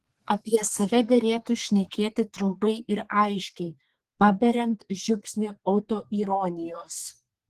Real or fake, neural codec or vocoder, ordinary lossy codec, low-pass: fake; codec, 32 kHz, 1.9 kbps, SNAC; Opus, 16 kbps; 14.4 kHz